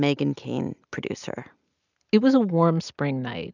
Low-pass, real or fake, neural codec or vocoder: 7.2 kHz; real; none